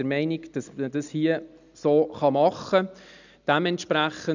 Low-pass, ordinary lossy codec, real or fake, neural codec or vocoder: 7.2 kHz; none; real; none